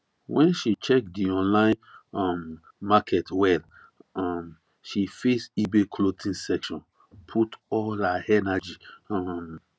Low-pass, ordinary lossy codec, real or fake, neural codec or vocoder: none; none; real; none